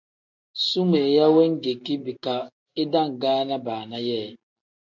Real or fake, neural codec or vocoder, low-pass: real; none; 7.2 kHz